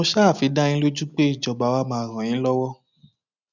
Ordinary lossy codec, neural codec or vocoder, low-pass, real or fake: none; none; 7.2 kHz; real